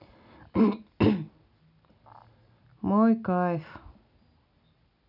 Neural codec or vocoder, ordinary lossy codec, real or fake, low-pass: none; none; real; 5.4 kHz